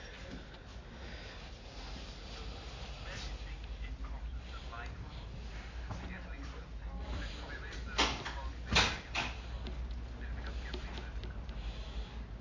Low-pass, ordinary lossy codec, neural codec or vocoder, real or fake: 7.2 kHz; AAC, 32 kbps; none; real